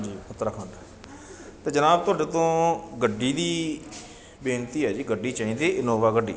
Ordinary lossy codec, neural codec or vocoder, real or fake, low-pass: none; none; real; none